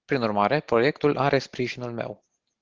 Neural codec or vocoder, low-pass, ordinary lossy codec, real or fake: none; 7.2 kHz; Opus, 16 kbps; real